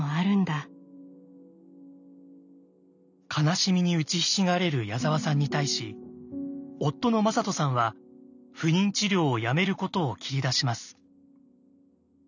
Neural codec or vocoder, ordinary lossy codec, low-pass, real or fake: none; none; 7.2 kHz; real